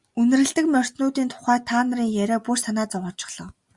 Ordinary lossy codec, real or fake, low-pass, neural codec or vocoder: Opus, 64 kbps; real; 10.8 kHz; none